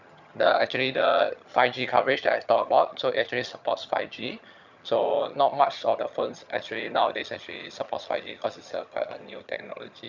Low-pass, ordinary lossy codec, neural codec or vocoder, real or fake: 7.2 kHz; none; vocoder, 22.05 kHz, 80 mel bands, HiFi-GAN; fake